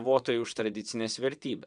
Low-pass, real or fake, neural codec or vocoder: 9.9 kHz; fake; vocoder, 22.05 kHz, 80 mel bands, WaveNeXt